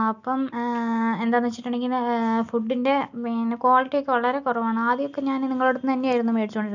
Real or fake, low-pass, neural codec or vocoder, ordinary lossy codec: real; 7.2 kHz; none; none